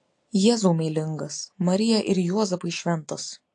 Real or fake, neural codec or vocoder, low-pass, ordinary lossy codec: real; none; 9.9 kHz; AAC, 48 kbps